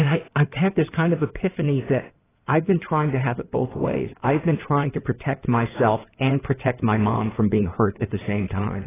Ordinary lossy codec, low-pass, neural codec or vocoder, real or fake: AAC, 16 kbps; 3.6 kHz; codec, 16 kHz in and 24 kHz out, 2.2 kbps, FireRedTTS-2 codec; fake